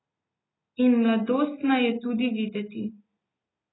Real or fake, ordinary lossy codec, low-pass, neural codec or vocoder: real; AAC, 16 kbps; 7.2 kHz; none